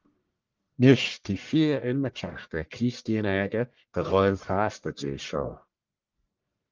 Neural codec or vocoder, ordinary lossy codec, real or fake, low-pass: codec, 44.1 kHz, 1.7 kbps, Pupu-Codec; Opus, 32 kbps; fake; 7.2 kHz